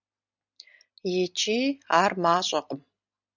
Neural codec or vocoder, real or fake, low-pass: none; real; 7.2 kHz